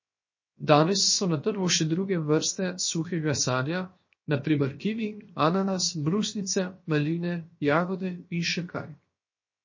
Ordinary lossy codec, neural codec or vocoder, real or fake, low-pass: MP3, 32 kbps; codec, 16 kHz, 0.7 kbps, FocalCodec; fake; 7.2 kHz